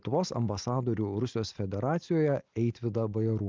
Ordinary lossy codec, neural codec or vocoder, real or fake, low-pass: Opus, 24 kbps; none; real; 7.2 kHz